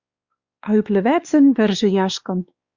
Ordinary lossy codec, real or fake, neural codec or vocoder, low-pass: Opus, 64 kbps; fake; codec, 16 kHz, 2 kbps, X-Codec, WavLM features, trained on Multilingual LibriSpeech; 7.2 kHz